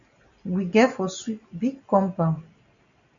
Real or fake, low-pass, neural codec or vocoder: real; 7.2 kHz; none